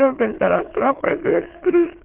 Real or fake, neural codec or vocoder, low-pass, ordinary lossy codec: fake; autoencoder, 22.05 kHz, a latent of 192 numbers a frame, VITS, trained on many speakers; 3.6 kHz; Opus, 16 kbps